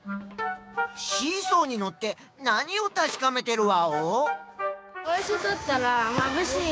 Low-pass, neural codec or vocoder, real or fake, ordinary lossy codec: none; codec, 16 kHz, 6 kbps, DAC; fake; none